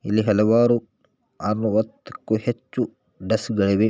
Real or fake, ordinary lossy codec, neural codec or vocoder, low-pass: real; none; none; none